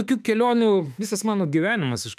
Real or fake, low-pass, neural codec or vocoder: fake; 14.4 kHz; autoencoder, 48 kHz, 32 numbers a frame, DAC-VAE, trained on Japanese speech